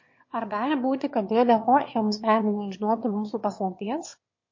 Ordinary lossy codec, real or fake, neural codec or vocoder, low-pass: MP3, 32 kbps; fake; autoencoder, 22.05 kHz, a latent of 192 numbers a frame, VITS, trained on one speaker; 7.2 kHz